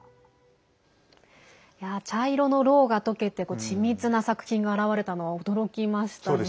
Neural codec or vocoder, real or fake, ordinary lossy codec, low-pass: none; real; none; none